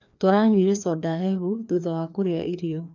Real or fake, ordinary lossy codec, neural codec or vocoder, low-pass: fake; none; codec, 16 kHz, 2 kbps, FreqCodec, larger model; 7.2 kHz